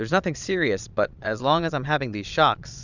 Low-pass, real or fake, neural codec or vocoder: 7.2 kHz; fake; vocoder, 22.05 kHz, 80 mel bands, Vocos